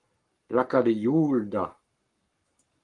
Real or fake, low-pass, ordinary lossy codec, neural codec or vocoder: fake; 10.8 kHz; Opus, 32 kbps; codec, 44.1 kHz, 7.8 kbps, Pupu-Codec